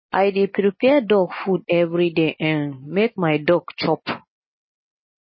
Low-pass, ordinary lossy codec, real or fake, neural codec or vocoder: 7.2 kHz; MP3, 24 kbps; real; none